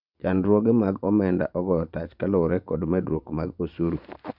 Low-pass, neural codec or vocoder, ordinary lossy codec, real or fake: 5.4 kHz; vocoder, 24 kHz, 100 mel bands, Vocos; none; fake